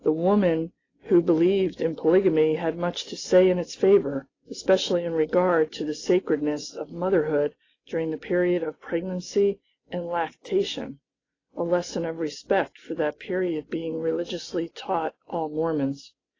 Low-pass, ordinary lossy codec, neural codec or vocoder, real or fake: 7.2 kHz; AAC, 32 kbps; none; real